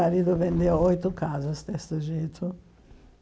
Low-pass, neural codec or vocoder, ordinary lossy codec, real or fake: none; none; none; real